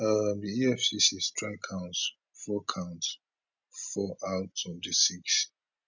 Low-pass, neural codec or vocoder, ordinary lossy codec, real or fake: 7.2 kHz; none; none; real